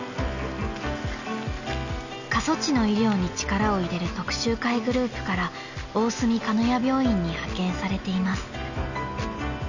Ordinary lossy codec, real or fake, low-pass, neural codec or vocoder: none; real; 7.2 kHz; none